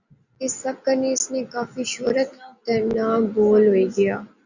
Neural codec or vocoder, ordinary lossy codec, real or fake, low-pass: none; Opus, 64 kbps; real; 7.2 kHz